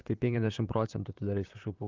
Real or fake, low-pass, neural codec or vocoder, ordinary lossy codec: fake; 7.2 kHz; vocoder, 44.1 kHz, 128 mel bands, Pupu-Vocoder; Opus, 32 kbps